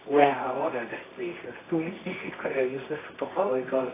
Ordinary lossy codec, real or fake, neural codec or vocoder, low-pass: AAC, 16 kbps; fake; codec, 24 kHz, 0.9 kbps, WavTokenizer, medium music audio release; 3.6 kHz